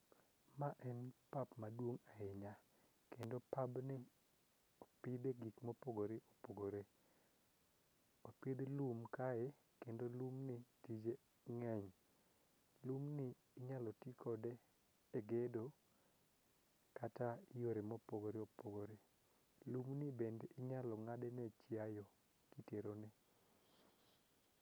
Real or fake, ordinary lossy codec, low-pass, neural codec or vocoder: real; none; none; none